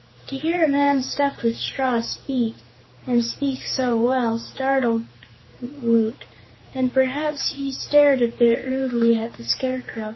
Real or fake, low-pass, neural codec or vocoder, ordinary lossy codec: fake; 7.2 kHz; codec, 16 kHz, 4 kbps, X-Codec, HuBERT features, trained on general audio; MP3, 24 kbps